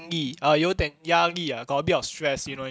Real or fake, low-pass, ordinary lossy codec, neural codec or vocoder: real; none; none; none